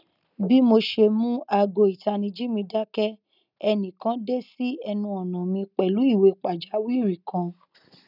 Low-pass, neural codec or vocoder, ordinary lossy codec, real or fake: 5.4 kHz; none; none; real